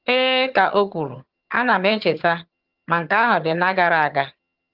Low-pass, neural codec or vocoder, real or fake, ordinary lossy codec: 5.4 kHz; vocoder, 22.05 kHz, 80 mel bands, HiFi-GAN; fake; Opus, 24 kbps